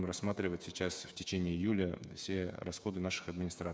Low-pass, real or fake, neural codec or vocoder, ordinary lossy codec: none; fake; codec, 16 kHz, 8 kbps, FreqCodec, smaller model; none